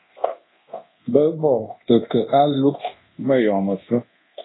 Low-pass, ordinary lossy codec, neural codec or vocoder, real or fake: 7.2 kHz; AAC, 16 kbps; codec, 24 kHz, 0.9 kbps, DualCodec; fake